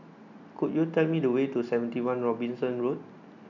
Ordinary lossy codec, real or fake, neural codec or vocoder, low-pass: none; real; none; 7.2 kHz